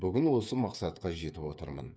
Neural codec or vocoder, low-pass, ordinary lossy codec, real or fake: codec, 16 kHz, 8 kbps, FreqCodec, smaller model; none; none; fake